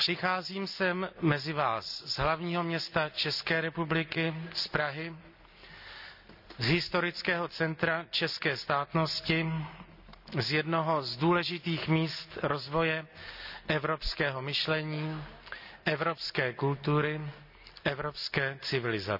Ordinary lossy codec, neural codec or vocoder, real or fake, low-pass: none; none; real; 5.4 kHz